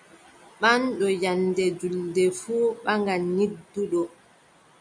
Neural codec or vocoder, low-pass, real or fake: none; 9.9 kHz; real